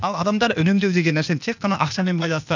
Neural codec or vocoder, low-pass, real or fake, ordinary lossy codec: codec, 16 kHz, 0.8 kbps, ZipCodec; 7.2 kHz; fake; none